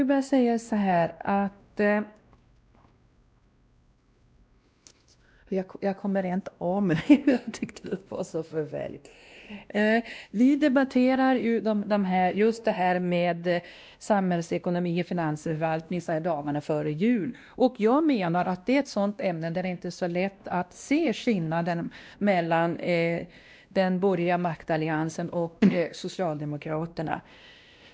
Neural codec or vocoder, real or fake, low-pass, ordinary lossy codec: codec, 16 kHz, 1 kbps, X-Codec, WavLM features, trained on Multilingual LibriSpeech; fake; none; none